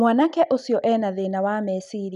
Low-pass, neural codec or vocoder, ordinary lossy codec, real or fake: 10.8 kHz; none; none; real